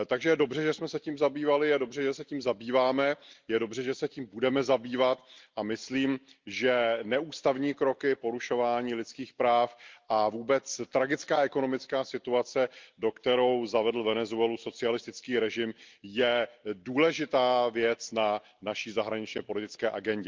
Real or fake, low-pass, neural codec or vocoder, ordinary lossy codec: real; 7.2 kHz; none; Opus, 32 kbps